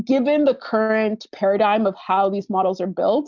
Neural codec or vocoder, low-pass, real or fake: none; 7.2 kHz; real